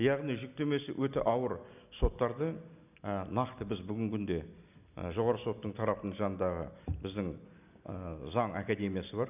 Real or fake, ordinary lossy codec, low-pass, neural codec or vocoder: real; none; 3.6 kHz; none